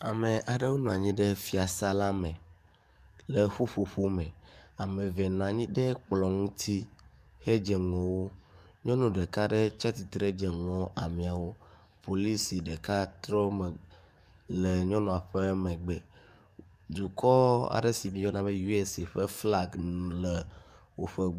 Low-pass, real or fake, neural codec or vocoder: 14.4 kHz; fake; codec, 44.1 kHz, 7.8 kbps, DAC